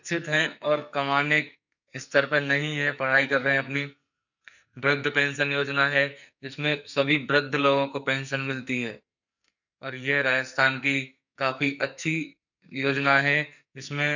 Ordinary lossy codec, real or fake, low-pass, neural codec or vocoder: none; fake; 7.2 kHz; codec, 44.1 kHz, 2.6 kbps, SNAC